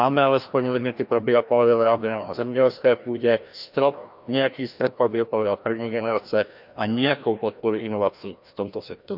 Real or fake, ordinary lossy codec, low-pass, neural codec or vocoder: fake; none; 5.4 kHz; codec, 16 kHz, 1 kbps, FreqCodec, larger model